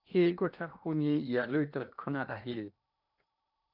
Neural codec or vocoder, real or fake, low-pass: codec, 16 kHz in and 24 kHz out, 0.8 kbps, FocalCodec, streaming, 65536 codes; fake; 5.4 kHz